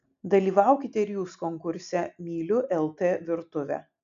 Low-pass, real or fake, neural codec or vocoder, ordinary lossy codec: 7.2 kHz; real; none; AAC, 64 kbps